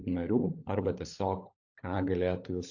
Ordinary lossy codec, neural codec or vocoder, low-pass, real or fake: AAC, 48 kbps; codec, 16 kHz, 16 kbps, FunCodec, trained on LibriTTS, 50 frames a second; 7.2 kHz; fake